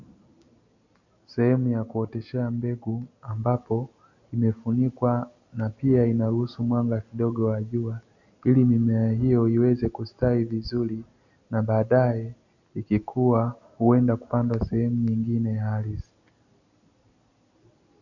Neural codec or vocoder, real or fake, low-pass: none; real; 7.2 kHz